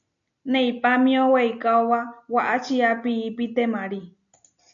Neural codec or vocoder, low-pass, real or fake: none; 7.2 kHz; real